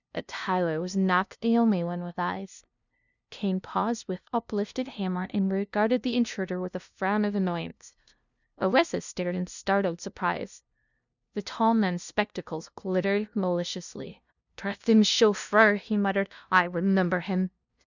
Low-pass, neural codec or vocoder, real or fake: 7.2 kHz; codec, 16 kHz, 0.5 kbps, FunCodec, trained on LibriTTS, 25 frames a second; fake